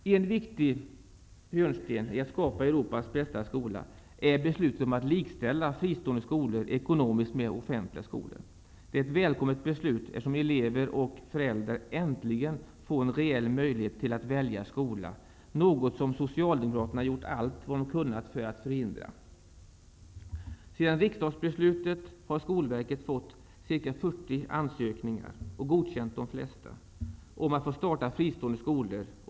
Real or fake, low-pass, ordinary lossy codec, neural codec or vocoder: real; none; none; none